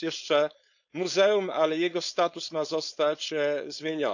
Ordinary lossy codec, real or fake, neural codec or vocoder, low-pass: none; fake; codec, 16 kHz, 4.8 kbps, FACodec; 7.2 kHz